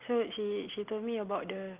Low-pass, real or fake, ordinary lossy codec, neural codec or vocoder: 3.6 kHz; real; Opus, 32 kbps; none